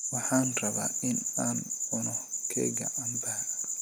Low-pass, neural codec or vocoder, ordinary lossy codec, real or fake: none; none; none; real